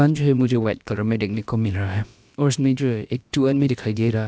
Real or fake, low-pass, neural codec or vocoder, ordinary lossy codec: fake; none; codec, 16 kHz, about 1 kbps, DyCAST, with the encoder's durations; none